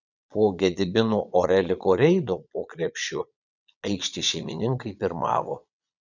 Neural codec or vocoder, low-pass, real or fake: vocoder, 22.05 kHz, 80 mel bands, Vocos; 7.2 kHz; fake